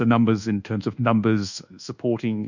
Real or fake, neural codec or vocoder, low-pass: fake; codec, 24 kHz, 1.2 kbps, DualCodec; 7.2 kHz